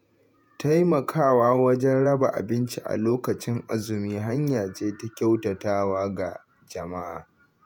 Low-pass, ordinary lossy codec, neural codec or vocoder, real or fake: none; none; vocoder, 48 kHz, 128 mel bands, Vocos; fake